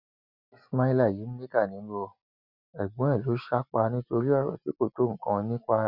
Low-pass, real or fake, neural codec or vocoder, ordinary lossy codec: 5.4 kHz; real; none; none